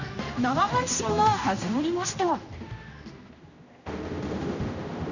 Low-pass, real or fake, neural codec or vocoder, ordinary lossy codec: 7.2 kHz; fake; codec, 16 kHz, 1 kbps, X-Codec, HuBERT features, trained on general audio; none